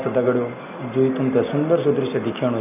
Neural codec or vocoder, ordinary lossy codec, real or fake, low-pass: none; none; real; 3.6 kHz